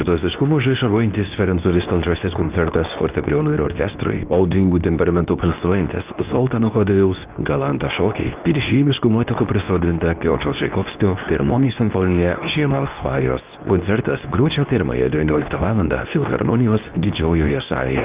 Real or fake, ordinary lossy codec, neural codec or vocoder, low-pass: fake; Opus, 24 kbps; codec, 24 kHz, 0.9 kbps, WavTokenizer, medium speech release version 2; 3.6 kHz